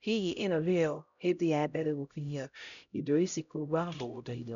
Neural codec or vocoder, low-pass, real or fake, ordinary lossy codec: codec, 16 kHz, 0.5 kbps, X-Codec, HuBERT features, trained on LibriSpeech; 7.2 kHz; fake; none